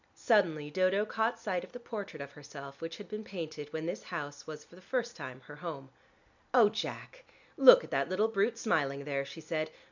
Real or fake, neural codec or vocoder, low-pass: real; none; 7.2 kHz